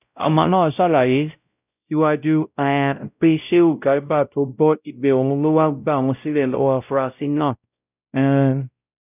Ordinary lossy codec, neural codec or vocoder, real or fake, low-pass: none; codec, 16 kHz, 0.5 kbps, X-Codec, WavLM features, trained on Multilingual LibriSpeech; fake; 3.6 kHz